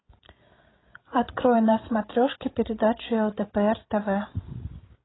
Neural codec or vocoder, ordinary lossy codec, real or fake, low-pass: codec, 16 kHz, 16 kbps, FreqCodec, larger model; AAC, 16 kbps; fake; 7.2 kHz